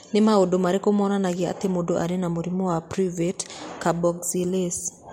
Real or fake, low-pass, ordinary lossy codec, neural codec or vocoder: fake; 19.8 kHz; MP3, 64 kbps; vocoder, 44.1 kHz, 128 mel bands every 256 samples, BigVGAN v2